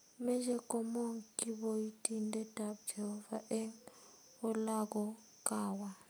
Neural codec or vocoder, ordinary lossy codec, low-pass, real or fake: none; none; none; real